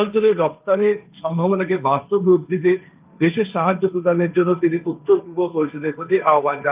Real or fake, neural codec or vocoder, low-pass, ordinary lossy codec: fake; codec, 16 kHz, 1.1 kbps, Voila-Tokenizer; 3.6 kHz; Opus, 24 kbps